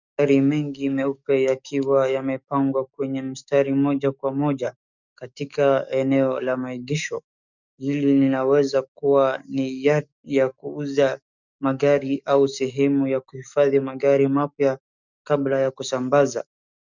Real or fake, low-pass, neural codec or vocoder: fake; 7.2 kHz; codec, 44.1 kHz, 7.8 kbps, DAC